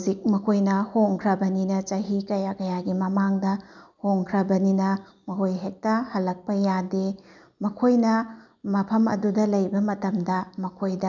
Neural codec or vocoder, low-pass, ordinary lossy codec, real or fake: none; 7.2 kHz; none; real